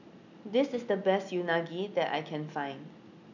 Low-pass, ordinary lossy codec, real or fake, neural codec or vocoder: 7.2 kHz; none; real; none